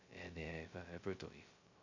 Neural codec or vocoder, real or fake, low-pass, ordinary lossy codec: codec, 16 kHz, 0.2 kbps, FocalCodec; fake; 7.2 kHz; MP3, 48 kbps